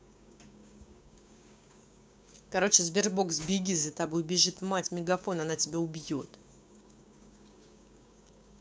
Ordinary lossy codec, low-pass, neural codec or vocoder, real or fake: none; none; codec, 16 kHz, 6 kbps, DAC; fake